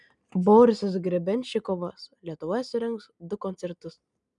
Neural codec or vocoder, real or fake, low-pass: none; real; 10.8 kHz